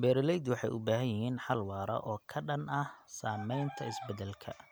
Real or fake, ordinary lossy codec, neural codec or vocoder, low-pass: real; none; none; none